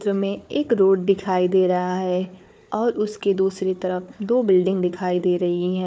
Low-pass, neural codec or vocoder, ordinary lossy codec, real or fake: none; codec, 16 kHz, 4 kbps, FunCodec, trained on Chinese and English, 50 frames a second; none; fake